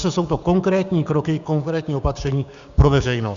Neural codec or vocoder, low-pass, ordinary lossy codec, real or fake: codec, 16 kHz, 6 kbps, DAC; 7.2 kHz; Opus, 64 kbps; fake